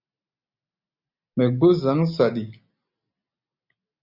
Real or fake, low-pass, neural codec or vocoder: real; 5.4 kHz; none